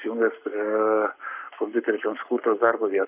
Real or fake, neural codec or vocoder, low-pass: fake; codec, 44.1 kHz, 7.8 kbps, Pupu-Codec; 3.6 kHz